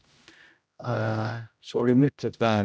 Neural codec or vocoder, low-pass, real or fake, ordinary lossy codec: codec, 16 kHz, 0.5 kbps, X-Codec, HuBERT features, trained on general audio; none; fake; none